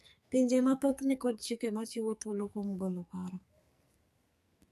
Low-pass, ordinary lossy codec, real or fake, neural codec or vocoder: 14.4 kHz; MP3, 96 kbps; fake; codec, 44.1 kHz, 2.6 kbps, SNAC